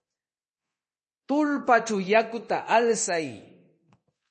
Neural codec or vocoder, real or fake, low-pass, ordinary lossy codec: codec, 24 kHz, 0.9 kbps, DualCodec; fake; 10.8 kHz; MP3, 32 kbps